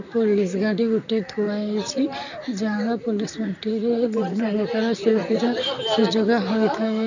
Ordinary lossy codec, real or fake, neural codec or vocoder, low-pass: none; fake; vocoder, 44.1 kHz, 128 mel bands, Pupu-Vocoder; 7.2 kHz